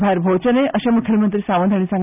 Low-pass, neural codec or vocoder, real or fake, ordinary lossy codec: 3.6 kHz; none; real; none